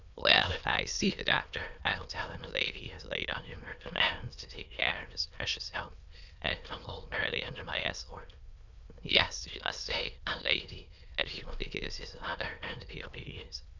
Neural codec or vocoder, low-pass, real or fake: autoencoder, 22.05 kHz, a latent of 192 numbers a frame, VITS, trained on many speakers; 7.2 kHz; fake